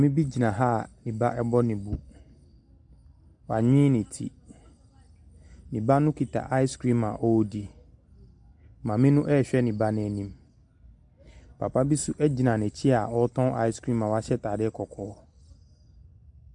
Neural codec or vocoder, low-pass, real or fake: none; 10.8 kHz; real